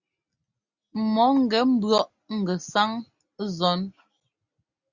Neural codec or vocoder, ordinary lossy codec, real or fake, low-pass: none; Opus, 64 kbps; real; 7.2 kHz